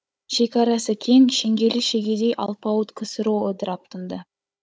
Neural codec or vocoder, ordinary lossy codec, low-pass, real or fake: codec, 16 kHz, 16 kbps, FunCodec, trained on Chinese and English, 50 frames a second; none; none; fake